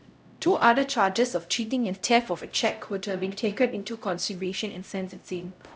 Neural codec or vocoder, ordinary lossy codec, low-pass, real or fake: codec, 16 kHz, 0.5 kbps, X-Codec, HuBERT features, trained on LibriSpeech; none; none; fake